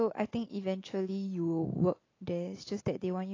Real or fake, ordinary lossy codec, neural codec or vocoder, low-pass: real; AAC, 32 kbps; none; 7.2 kHz